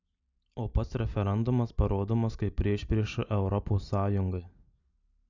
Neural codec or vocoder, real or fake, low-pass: none; real; 7.2 kHz